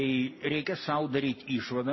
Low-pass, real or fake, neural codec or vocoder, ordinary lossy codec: 7.2 kHz; real; none; MP3, 24 kbps